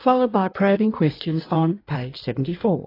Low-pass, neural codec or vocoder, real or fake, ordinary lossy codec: 5.4 kHz; codec, 16 kHz in and 24 kHz out, 1.1 kbps, FireRedTTS-2 codec; fake; AAC, 24 kbps